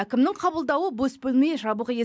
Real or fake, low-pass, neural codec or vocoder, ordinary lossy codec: real; none; none; none